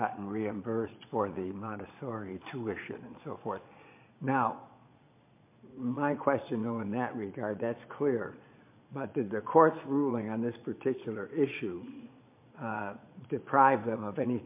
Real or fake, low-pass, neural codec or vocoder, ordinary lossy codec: fake; 3.6 kHz; vocoder, 22.05 kHz, 80 mel bands, Vocos; MP3, 24 kbps